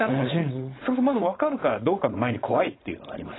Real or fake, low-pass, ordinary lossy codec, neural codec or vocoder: fake; 7.2 kHz; AAC, 16 kbps; codec, 16 kHz, 4.8 kbps, FACodec